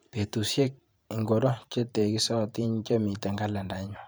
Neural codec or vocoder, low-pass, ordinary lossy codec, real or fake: vocoder, 44.1 kHz, 128 mel bands every 512 samples, BigVGAN v2; none; none; fake